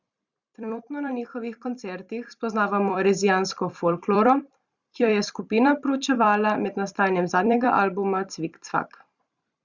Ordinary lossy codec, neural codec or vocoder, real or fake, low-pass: Opus, 64 kbps; vocoder, 44.1 kHz, 128 mel bands every 256 samples, BigVGAN v2; fake; 7.2 kHz